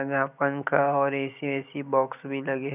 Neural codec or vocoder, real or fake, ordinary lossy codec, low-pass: none; real; none; 3.6 kHz